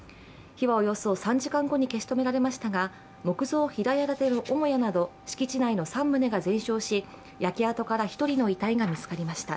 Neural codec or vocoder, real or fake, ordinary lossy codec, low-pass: none; real; none; none